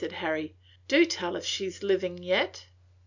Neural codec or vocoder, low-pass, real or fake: none; 7.2 kHz; real